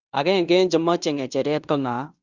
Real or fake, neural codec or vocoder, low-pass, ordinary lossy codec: fake; codec, 16 kHz in and 24 kHz out, 0.9 kbps, LongCat-Audio-Codec, fine tuned four codebook decoder; 7.2 kHz; Opus, 64 kbps